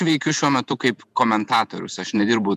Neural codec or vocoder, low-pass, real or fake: vocoder, 48 kHz, 128 mel bands, Vocos; 14.4 kHz; fake